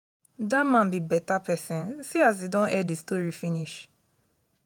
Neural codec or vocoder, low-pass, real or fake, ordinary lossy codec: vocoder, 48 kHz, 128 mel bands, Vocos; none; fake; none